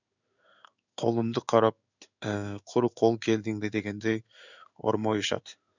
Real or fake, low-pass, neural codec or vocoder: fake; 7.2 kHz; codec, 16 kHz in and 24 kHz out, 1 kbps, XY-Tokenizer